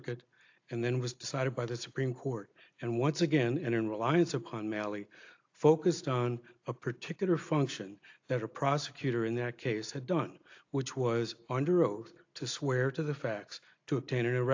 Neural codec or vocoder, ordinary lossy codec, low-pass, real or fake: none; AAC, 48 kbps; 7.2 kHz; real